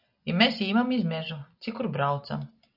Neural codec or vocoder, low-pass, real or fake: none; 5.4 kHz; real